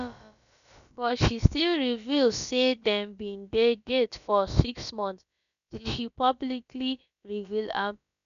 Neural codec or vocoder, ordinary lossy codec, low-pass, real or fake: codec, 16 kHz, about 1 kbps, DyCAST, with the encoder's durations; none; 7.2 kHz; fake